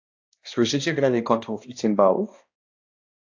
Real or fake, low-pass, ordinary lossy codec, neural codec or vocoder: fake; 7.2 kHz; AAC, 48 kbps; codec, 16 kHz, 1 kbps, X-Codec, HuBERT features, trained on balanced general audio